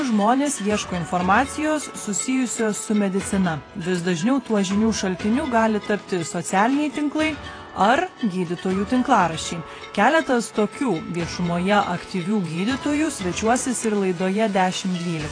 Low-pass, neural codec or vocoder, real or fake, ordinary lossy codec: 9.9 kHz; vocoder, 48 kHz, 128 mel bands, Vocos; fake; AAC, 32 kbps